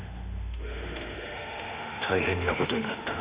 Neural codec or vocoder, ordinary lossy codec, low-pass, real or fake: autoencoder, 48 kHz, 32 numbers a frame, DAC-VAE, trained on Japanese speech; Opus, 64 kbps; 3.6 kHz; fake